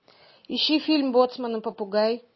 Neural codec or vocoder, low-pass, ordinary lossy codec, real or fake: none; 7.2 kHz; MP3, 24 kbps; real